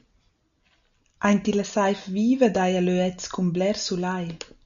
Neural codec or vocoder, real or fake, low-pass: none; real; 7.2 kHz